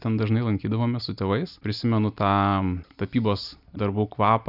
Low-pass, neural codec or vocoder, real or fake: 5.4 kHz; none; real